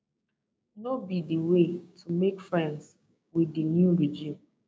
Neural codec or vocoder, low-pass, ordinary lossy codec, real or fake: codec, 16 kHz, 6 kbps, DAC; none; none; fake